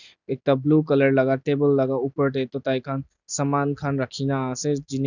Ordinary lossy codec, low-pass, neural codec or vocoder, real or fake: none; 7.2 kHz; none; real